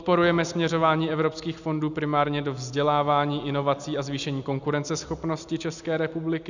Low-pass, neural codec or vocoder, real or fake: 7.2 kHz; none; real